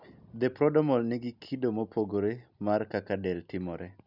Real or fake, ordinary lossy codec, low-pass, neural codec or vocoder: real; none; 5.4 kHz; none